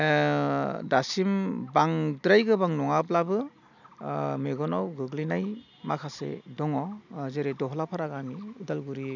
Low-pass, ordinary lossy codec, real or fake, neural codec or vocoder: 7.2 kHz; none; real; none